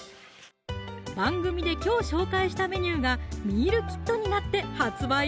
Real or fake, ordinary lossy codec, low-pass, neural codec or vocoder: real; none; none; none